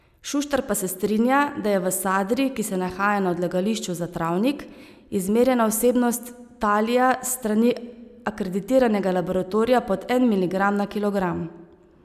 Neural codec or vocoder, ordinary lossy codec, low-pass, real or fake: none; none; 14.4 kHz; real